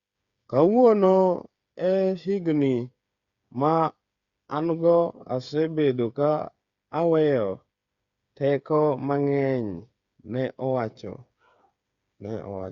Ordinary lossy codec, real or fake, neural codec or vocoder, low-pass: Opus, 64 kbps; fake; codec, 16 kHz, 8 kbps, FreqCodec, smaller model; 7.2 kHz